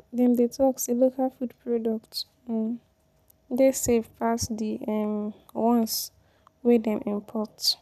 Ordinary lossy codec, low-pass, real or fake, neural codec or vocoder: none; 14.4 kHz; real; none